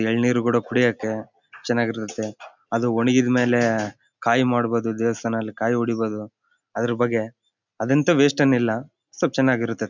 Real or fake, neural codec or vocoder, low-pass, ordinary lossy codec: real; none; 7.2 kHz; none